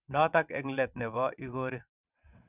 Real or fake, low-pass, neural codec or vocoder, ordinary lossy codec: fake; 3.6 kHz; vocoder, 22.05 kHz, 80 mel bands, WaveNeXt; none